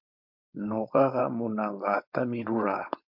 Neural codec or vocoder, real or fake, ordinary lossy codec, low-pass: codec, 16 kHz, 4.8 kbps, FACodec; fake; MP3, 48 kbps; 5.4 kHz